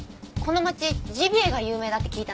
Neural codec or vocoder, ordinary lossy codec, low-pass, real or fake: none; none; none; real